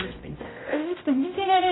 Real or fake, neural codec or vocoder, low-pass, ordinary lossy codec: fake; codec, 16 kHz, 0.5 kbps, X-Codec, HuBERT features, trained on general audio; 7.2 kHz; AAC, 16 kbps